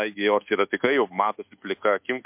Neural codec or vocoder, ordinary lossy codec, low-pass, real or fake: codec, 24 kHz, 1.2 kbps, DualCodec; MP3, 32 kbps; 3.6 kHz; fake